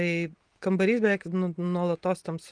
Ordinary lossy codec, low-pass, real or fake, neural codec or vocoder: Opus, 24 kbps; 9.9 kHz; real; none